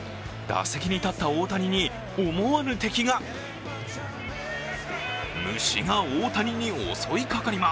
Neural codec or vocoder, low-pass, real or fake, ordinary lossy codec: none; none; real; none